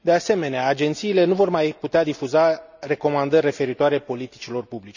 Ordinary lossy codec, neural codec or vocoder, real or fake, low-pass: none; none; real; 7.2 kHz